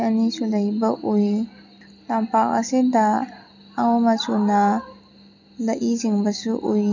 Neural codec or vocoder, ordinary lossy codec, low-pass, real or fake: none; none; 7.2 kHz; real